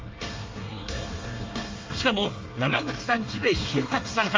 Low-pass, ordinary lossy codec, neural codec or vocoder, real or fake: 7.2 kHz; Opus, 32 kbps; codec, 24 kHz, 1 kbps, SNAC; fake